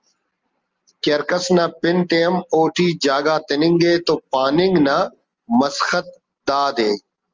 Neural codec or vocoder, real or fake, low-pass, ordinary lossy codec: none; real; 7.2 kHz; Opus, 24 kbps